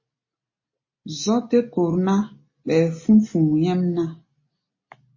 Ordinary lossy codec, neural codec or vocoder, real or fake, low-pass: MP3, 32 kbps; none; real; 7.2 kHz